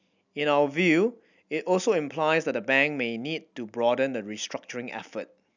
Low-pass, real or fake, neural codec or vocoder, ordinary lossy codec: 7.2 kHz; real; none; none